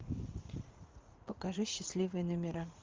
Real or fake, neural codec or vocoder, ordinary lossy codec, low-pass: fake; vocoder, 44.1 kHz, 80 mel bands, Vocos; Opus, 32 kbps; 7.2 kHz